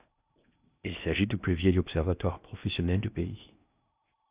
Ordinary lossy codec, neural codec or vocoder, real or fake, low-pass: Opus, 64 kbps; codec, 16 kHz in and 24 kHz out, 0.8 kbps, FocalCodec, streaming, 65536 codes; fake; 3.6 kHz